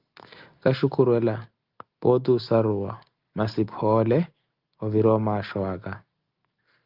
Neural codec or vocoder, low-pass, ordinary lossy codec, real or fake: none; 5.4 kHz; Opus, 32 kbps; real